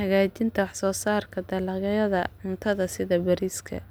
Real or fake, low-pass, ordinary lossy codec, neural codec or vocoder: real; none; none; none